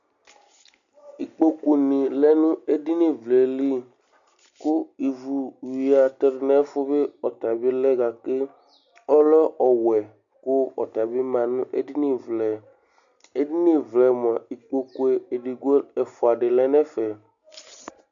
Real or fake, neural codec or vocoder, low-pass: real; none; 7.2 kHz